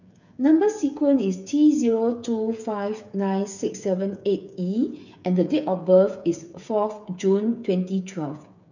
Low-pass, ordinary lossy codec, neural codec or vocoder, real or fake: 7.2 kHz; none; codec, 16 kHz, 8 kbps, FreqCodec, smaller model; fake